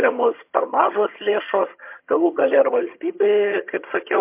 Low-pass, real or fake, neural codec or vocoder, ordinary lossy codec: 3.6 kHz; fake; vocoder, 22.05 kHz, 80 mel bands, HiFi-GAN; MP3, 24 kbps